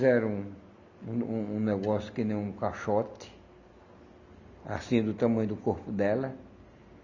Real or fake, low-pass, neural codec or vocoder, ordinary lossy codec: real; 7.2 kHz; none; none